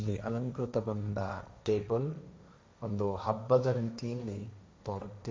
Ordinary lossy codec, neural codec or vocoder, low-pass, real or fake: none; codec, 16 kHz, 1.1 kbps, Voila-Tokenizer; none; fake